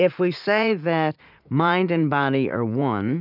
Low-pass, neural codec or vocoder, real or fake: 5.4 kHz; vocoder, 44.1 kHz, 80 mel bands, Vocos; fake